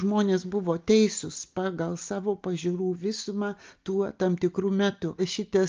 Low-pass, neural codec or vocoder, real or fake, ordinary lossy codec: 7.2 kHz; none; real; Opus, 24 kbps